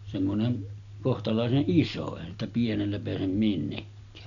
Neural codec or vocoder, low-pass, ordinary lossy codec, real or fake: none; 7.2 kHz; none; real